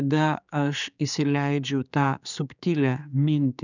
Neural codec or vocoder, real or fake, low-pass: codec, 16 kHz, 4 kbps, X-Codec, HuBERT features, trained on general audio; fake; 7.2 kHz